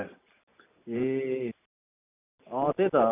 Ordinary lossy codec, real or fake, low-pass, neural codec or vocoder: none; real; 3.6 kHz; none